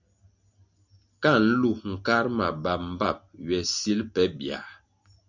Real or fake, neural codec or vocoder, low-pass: real; none; 7.2 kHz